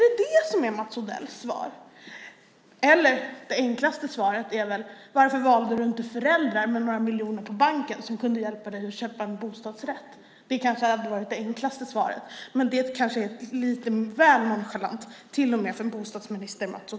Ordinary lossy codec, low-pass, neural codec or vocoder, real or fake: none; none; none; real